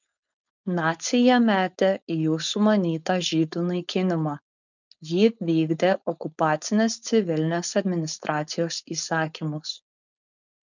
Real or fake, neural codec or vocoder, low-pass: fake; codec, 16 kHz, 4.8 kbps, FACodec; 7.2 kHz